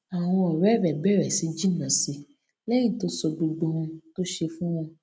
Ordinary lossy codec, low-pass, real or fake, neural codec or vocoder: none; none; real; none